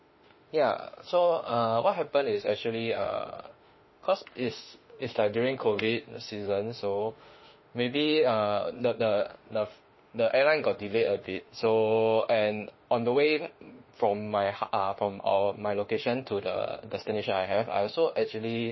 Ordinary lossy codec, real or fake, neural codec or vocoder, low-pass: MP3, 24 kbps; fake; autoencoder, 48 kHz, 32 numbers a frame, DAC-VAE, trained on Japanese speech; 7.2 kHz